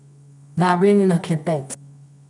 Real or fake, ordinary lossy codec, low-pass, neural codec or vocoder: fake; none; 10.8 kHz; codec, 24 kHz, 0.9 kbps, WavTokenizer, medium music audio release